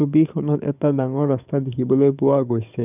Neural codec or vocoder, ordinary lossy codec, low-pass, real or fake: codec, 44.1 kHz, 7.8 kbps, DAC; none; 3.6 kHz; fake